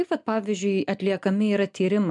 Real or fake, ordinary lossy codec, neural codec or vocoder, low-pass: real; MP3, 96 kbps; none; 10.8 kHz